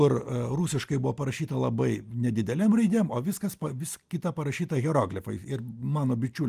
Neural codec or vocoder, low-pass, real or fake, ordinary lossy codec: none; 14.4 kHz; real; Opus, 32 kbps